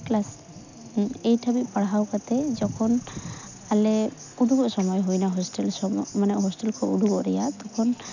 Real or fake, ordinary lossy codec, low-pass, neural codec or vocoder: real; none; 7.2 kHz; none